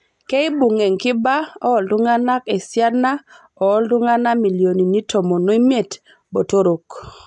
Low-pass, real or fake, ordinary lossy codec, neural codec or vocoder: 10.8 kHz; real; none; none